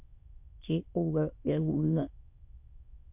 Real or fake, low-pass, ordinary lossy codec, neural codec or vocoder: fake; 3.6 kHz; MP3, 32 kbps; autoencoder, 22.05 kHz, a latent of 192 numbers a frame, VITS, trained on many speakers